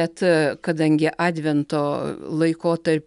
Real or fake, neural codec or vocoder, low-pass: real; none; 10.8 kHz